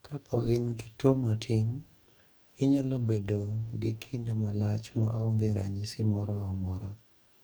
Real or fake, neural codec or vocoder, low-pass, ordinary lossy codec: fake; codec, 44.1 kHz, 2.6 kbps, DAC; none; none